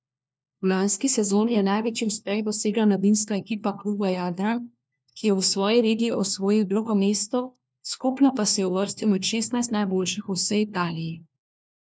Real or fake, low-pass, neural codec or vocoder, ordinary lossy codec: fake; none; codec, 16 kHz, 1 kbps, FunCodec, trained on LibriTTS, 50 frames a second; none